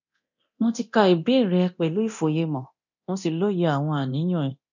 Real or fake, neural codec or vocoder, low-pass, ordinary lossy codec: fake; codec, 24 kHz, 0.9 kbps, DualCodec; 7.2 kHz; none